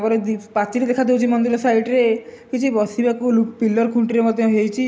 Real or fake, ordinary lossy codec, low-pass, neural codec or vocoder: real; none; none; none